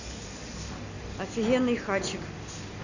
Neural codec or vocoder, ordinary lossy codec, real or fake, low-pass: none; none; real; 7.2 kHz